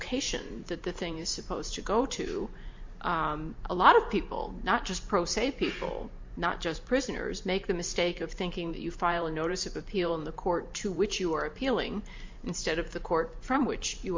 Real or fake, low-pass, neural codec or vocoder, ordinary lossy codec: fake; 7.2 kHz; vocoder, 44.1 kHz, 128 mel bands every 512 samples, BigVGAN v2; MP3, 48 kbps